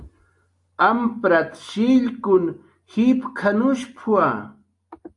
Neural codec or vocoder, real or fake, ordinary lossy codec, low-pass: none; real; AAC, 64 kbps; 10.8 kHz